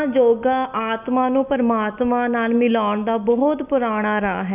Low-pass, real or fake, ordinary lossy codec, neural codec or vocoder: 3.6 kHz; real; none; none